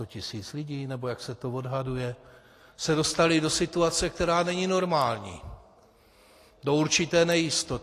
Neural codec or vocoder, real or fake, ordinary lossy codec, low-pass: none; real; AAC, 48 kbps; 14.4 kHz